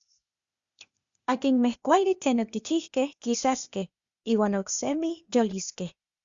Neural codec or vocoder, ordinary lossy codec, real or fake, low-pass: codec, 16 kHz, 0.8 kbps, ZipCodec; Opus, 64 kbps; fake; 7.2 kHz